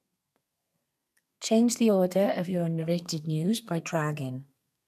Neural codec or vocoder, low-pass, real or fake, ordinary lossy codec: codec, 32 kHz, 1.9 kbps, SNAC; 14.4 kHz; fake; none